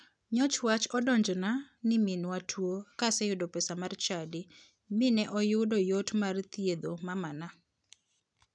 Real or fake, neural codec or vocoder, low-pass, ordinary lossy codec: real; none; 9.9 kHz; none